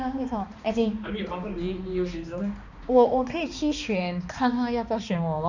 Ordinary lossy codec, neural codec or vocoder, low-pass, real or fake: none; codec, 16 kHz, 2 kbps, X-Codec, HuBERT features, trained on balanced general audio; 7.2 kHz; fake